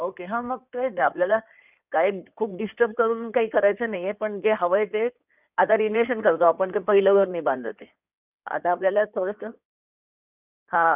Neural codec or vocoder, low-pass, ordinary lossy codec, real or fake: codec, 16 kHz in and 24 kHz out, 2.2 kbps, FireRedTTS-2 codec; 3.6 kHz; none; fake